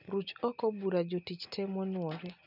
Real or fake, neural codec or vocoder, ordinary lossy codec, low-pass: real; none; none; 5.4 kHz